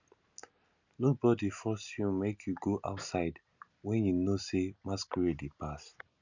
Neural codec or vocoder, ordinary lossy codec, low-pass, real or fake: none; none; 7.2 kHz; real